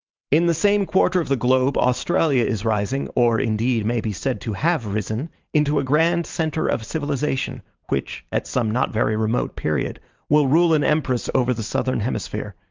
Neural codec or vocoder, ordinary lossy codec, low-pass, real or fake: vocoder, 44.1 kHz, 128 mel bands every 512 samples, BigVGAN v2; Opus, 24 kbps; 7.2 kHz; fake